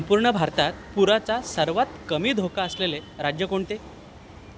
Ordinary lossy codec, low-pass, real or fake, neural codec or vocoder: none; none; real; none